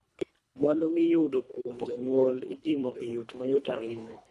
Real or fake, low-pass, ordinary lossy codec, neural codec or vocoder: fake; none; none; codec, 24 kHz, 1.5 kbps, HILCodec